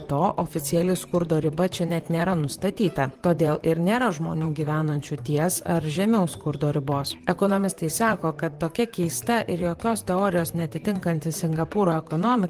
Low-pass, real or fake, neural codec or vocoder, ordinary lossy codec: 14.4 kHz; fake; vocoder, 44.1 kHz, 128 mel bands, Pupu-Vocoder; Opus, 24 kbps